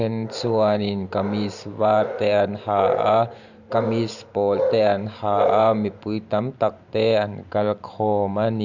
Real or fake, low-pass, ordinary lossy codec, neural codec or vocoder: fake; 7.2 kHz; none; vocoder, 44.1 kHz, 128 mel bands every 512 samples, BigVGAN v2